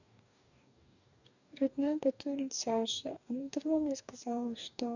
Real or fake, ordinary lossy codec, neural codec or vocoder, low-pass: fake; none; codec, 44.1 kHz, 2.6 kbps, DAC; 7.2 kHz